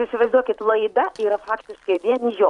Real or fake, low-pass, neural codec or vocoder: fake; 10.8 kHz; vocoder, 44.1 kHz, 128 mel bands every 512 samples, BigVGAN v2